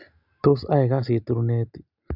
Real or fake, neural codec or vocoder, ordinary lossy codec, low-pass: real; none; none; 5.4 kHz